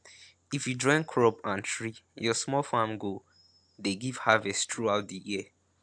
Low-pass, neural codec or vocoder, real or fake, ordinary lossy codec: 9.9 kHz; none; real; AAC, 64 kbps